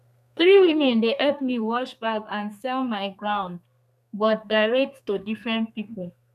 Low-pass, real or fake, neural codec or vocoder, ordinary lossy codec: 14.4 kHz; fake; codec, 32 kHz, 1.9 kbps, SNAC; none